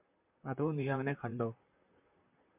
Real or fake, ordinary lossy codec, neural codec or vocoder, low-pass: fake; MP3, 32 kbps; vocoder, 44.1 kHz, 128 mel bands, Pupu-Vocoder; 3.6 kHz